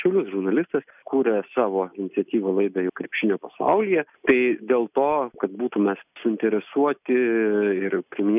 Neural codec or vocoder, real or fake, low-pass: none; real; 3.6 kHz